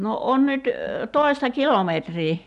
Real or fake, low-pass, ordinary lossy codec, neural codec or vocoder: real; 10.8 kHz; none; none